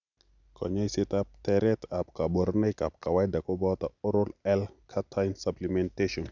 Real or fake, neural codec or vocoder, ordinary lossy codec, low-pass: real; none; none; 7.2 kHz